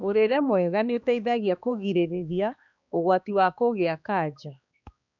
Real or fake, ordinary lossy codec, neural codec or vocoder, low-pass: fake; none; codec, 16 kHz, 2 kbps, X-Codec, HuBERT features, trained on balanced general audio; 7.2 kHz